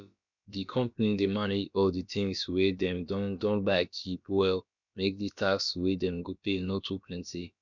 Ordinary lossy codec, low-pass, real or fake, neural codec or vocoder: none; 7.2 kHz; fake; codec, 16 kHz, about 1 kbps, DyCAST, with the encoder's durations